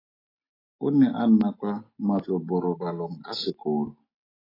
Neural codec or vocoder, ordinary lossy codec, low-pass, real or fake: none; AAC, 24 kbps; 5.4 kHz; real